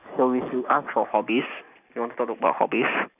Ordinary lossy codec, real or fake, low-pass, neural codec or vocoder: none; real; 3.6 kHz; none